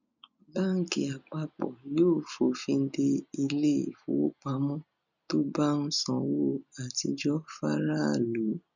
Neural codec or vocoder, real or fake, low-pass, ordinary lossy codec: none; real; 7.2 kHz; none